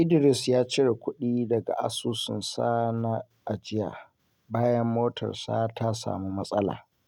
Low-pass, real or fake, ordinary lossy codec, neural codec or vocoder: 19.8 kHz; real; none; none